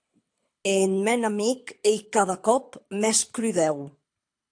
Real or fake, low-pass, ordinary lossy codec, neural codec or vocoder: fake; 9.9 kHz; AAC, 64 kbps; codec, 24 kHz, 6 kbps, HILCodec